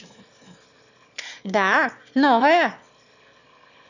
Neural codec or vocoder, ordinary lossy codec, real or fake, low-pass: autoencoder, 22.05 kHz, a latent of 192 numbers a frame, VITS, trained on one speaker; none; fake; 7.2 kHz